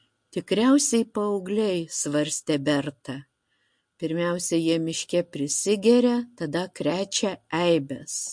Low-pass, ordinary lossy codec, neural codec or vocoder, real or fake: 9.9 kHz; MP3, 48 kbps; none; real